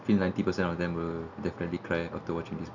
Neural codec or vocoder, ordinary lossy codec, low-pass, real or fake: none; none; 7.2 kHz; real